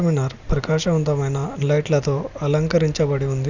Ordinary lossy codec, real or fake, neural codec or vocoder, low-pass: none; real; none; 7.2 kHz